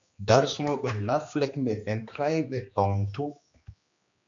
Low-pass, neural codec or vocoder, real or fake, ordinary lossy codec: 7.2 kHz; codec, 16 kHz, 2 kbps, X-Codec, HuBERT features, trained on general audio; fake; MP3, 64 kbps